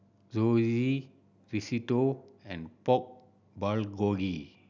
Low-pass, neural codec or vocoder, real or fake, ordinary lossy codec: 7.2 kHz; none; real; none